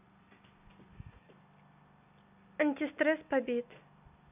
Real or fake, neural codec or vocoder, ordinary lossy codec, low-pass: real; none; none; 3.6 kHz